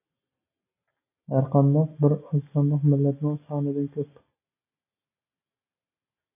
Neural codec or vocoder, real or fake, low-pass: none; real; 3.6 kHz